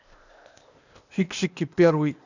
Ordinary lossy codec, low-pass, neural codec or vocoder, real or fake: none; 7.2 kHz; codec, 16 kHz, 0.8 kbps, ZipCodec; fake